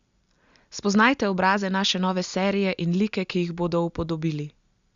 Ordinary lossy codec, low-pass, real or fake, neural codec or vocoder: Opus, 64 kbps; 7.2 kHz; real; none